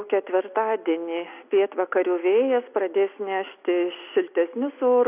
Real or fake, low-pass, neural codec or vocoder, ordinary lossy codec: real; 3.6 kHz; none; AAC, 32 kbps